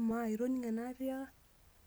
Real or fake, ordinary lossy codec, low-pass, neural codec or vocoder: real; none; none; none